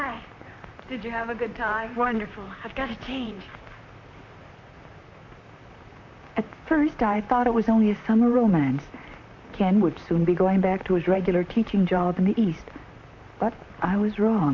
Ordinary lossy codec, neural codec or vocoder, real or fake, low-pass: MP3, 48 kbps; vocoder, 44.1 kHz, 128 mel bands, Pupu-Vocoder; fake; 7.2 kHz